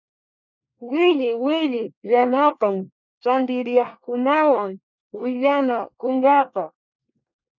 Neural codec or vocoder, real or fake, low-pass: codec, 24 kHz, 1 kbps, SNAC; fake; 7.2 kHz